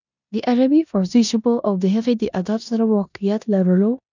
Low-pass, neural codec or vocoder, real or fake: 7.2 kHz; codec, 16 kHz in and 24 kHz out, 0.9 kbps, LongCat-Audio-Codec, four codebook decoder; fake